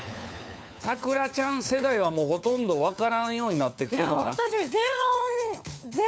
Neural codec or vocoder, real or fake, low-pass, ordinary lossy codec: codec, 16 kHz, 4 kbps, FunCodec, trained on LibriTTS, 50 frames a second; fake; none; none